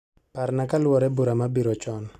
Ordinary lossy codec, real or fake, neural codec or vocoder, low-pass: none; real; none; 14.4 kHz